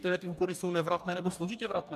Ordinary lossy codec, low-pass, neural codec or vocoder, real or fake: MP3, 96 kbps; 14.4 kHz; codec, 44.1 kHz, 2.6 kbps, DAC; fake